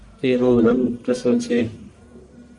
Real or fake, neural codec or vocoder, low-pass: fake; codec, 44.1 kHz, 1.7 kbps, Pupu-Codec; 10.8 kHz